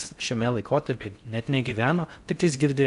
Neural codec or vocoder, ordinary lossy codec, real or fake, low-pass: codec, 16 kHz in and 24 kHz out, 0.6 kbps, FocalCodec, streaming, 4096 codes; MP3, 96 kbps; fake; 10.8 kHz